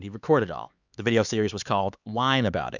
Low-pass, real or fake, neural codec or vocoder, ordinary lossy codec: 7.2 kHz; fake; codec, 16 kHz, 4 kbps, X-Codec, HuBERT features, trained on LibriSpeech; Opus, 64 kbps